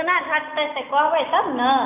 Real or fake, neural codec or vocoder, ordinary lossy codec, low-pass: real; none; none; 3.6 kHz